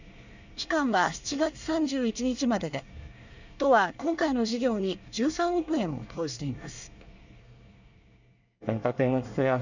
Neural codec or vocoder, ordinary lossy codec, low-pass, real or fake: codec, 24 kHz, 1 kbps, SNAC; none; 7.2 kHz; fake